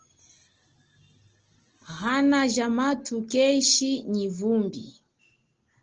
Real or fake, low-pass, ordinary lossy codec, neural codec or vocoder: real; 7.2 kHz; Opus, 16 kbps; none